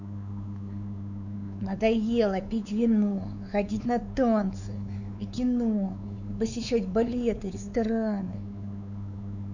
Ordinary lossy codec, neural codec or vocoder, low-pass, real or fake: none; codec, 16 kHz, 4 kbps, X-Codec, WavLM features, trained on Multilingual LibriSpeech; 7.2 kHz; fake